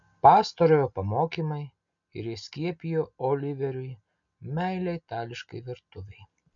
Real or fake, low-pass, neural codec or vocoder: real; 7.2 kHz; none